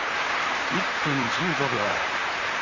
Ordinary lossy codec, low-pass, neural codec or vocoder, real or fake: Opus, 32 kbps; 7.2 kHz; vocoder, 44.1 kHz, 128 mel bands, Pupu-Vocoder; fake